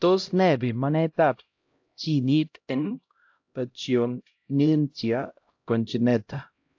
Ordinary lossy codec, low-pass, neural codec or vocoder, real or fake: AAC, 48 kbps; 7.2 kHz; codec, 16 kHz, 0.5 kbps, X-Codec, HuBERT features, trained on LibriSpeech; fake